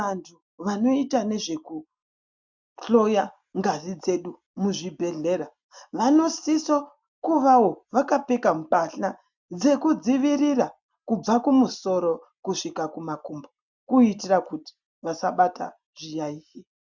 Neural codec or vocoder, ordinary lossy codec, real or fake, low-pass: none; MP3, 64 kbps; real; 7.2 kHz